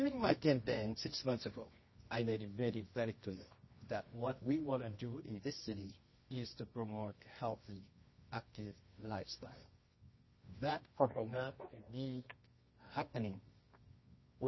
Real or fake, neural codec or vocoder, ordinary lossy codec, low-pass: fake; codec, 24 kHz, 0.9 kbps, WavTokenizer, medium music audio release; MP3, 24 kbps; 7.2 kHz